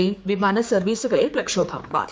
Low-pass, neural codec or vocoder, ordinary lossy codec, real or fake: none; codec, 16 kHz, 4 kbps, X-Codec, HuBERT features, trained on general audio; none; fake